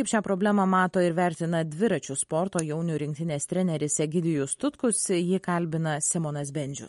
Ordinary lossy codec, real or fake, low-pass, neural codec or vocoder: MP3, 48 kbps; real; 19.8 kHz; none